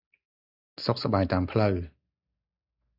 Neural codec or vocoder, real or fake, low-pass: none; real; 5.4 kHz